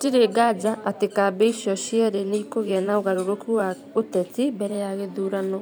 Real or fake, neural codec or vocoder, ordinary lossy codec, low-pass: fake; vocoder, 44.1 kHz, 128 mel bands every 512 samples, BigVGAN v2; none; none